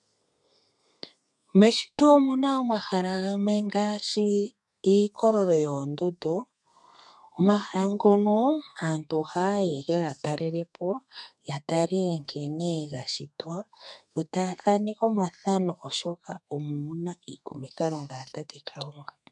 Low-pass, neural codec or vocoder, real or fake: 10.8 kHz; codec, 32 kHz, 1.9 kbps, SNAC; fake